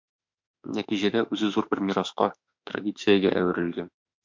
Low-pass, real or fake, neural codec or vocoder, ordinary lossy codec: 7.2 kHz; fake; autoencoder, 48 kHz, 32 numbers a frame, DAC-VAE, trained on Japanese speech; MP3, 64 kbps